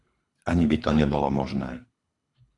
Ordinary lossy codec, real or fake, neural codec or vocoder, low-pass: AAC, 48 kbps; fake; codec, 24 kHz, 3 kbps, HILCodec; 10.8 kHz